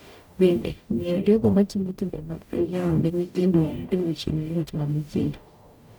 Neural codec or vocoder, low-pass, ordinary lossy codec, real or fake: codec, 44.1 kHz, 0.9 kbps, DAC; 19.8 kHz; none; fake